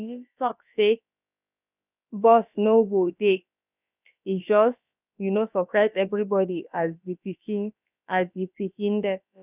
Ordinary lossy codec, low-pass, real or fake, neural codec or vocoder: none; 3.6 kHz; fake; codec, 16 kHz, about 1 kbps, DyCAST, with the encoder's durations